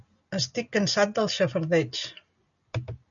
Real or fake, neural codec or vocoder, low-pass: real; none; 7.2 kHz